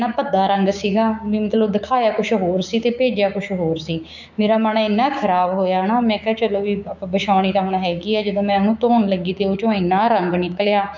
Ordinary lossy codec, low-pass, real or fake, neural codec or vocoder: none; 7.2 kHz; fake; codec, 16 kHz, 6 kbps, DAC